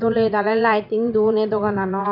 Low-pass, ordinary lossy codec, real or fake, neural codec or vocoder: 5.4 kHz; none; fake; vocoder, 22.05 kHz, 80 mel bands, Vocos